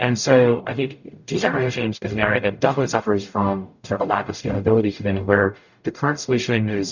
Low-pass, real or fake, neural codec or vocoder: 7.2 kHz; fake; codec, 44.1 kHz, 0.9 kbps, DAC